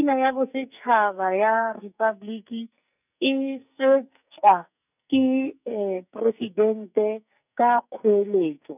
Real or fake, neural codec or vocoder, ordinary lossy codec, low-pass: fake; codec, 44.1 kHz, 2.6 kbps, SNAC; none; 3.6 kHz